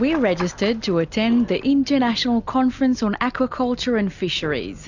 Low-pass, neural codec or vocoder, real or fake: 7.2 kHz; none; real